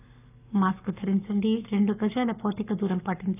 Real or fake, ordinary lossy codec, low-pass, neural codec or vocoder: fake; none; 3.6 kHz; codec, 44.1 kHz, 7.8 kbps, Pupu-Codec